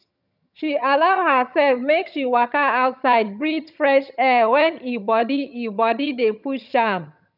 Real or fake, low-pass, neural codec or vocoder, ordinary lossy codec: fake; 5.4 kHz; vocoder, 22.05 kHz, 80 mel bands, HiFi-GAN; none